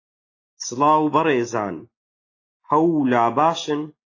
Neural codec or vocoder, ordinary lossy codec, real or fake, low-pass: none; AAC, 32 kbps; real; 7.2 kHz